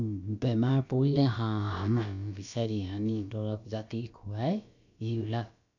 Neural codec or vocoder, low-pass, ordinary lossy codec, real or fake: codec, 16 kHz, about 1 kbps, DyCAST, with the encoder's durations; 7.2 kHz; none; fake